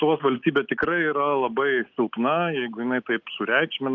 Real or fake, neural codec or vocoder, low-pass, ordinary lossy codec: real; none; 7.2 kHz; Opus, 32 kbps